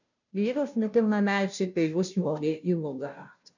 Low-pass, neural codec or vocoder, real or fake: 7.2 kHz; codec, 16 kHz, 0.5 kbps, FunCodec, trained on Chinese and English, 25 frames a second; fake